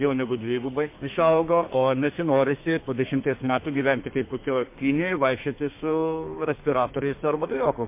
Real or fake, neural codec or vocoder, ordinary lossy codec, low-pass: fake; codec, 32 kHz, 1.9 kbps, SNAC; MP3, 24 kbps; 3.6 kHz